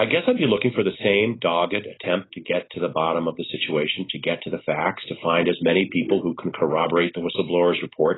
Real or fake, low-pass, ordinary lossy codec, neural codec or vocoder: real; 7.2 kHz; AAC, 16 kbps; none